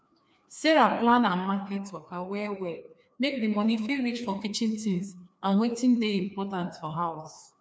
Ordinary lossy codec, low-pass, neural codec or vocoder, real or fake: none; none; codec, 16 kHz, 2 kbps, FreqCodec, larger model; fake